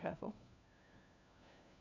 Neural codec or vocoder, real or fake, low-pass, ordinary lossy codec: codec, 16 kHz, 1 kbps, FunCodec, trained on LibriTTS, 50 frames a second; fake; 7.2 kHz; none